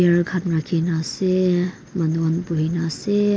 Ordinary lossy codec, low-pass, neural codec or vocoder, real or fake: none; none; none; real